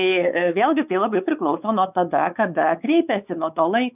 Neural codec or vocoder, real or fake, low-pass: codec, 16 kHz, 4 kbps, X-Codec, WavLM features, trained on Multilingual LibriSpeech; fake; 3.6 kHz